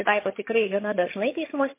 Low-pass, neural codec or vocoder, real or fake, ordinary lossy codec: 3.6 kHz; vocoder, 22.05 kHz, 80 mel bands, HiFi-GAN; fake; MP3, 24 kbps